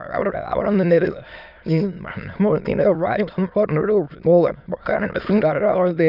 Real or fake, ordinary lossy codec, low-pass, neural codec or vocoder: fake; none; 5.4 kHz; autoencoder, 22.05 kHz, a latent of 192 numbers a frame, VITS, trained on many speakers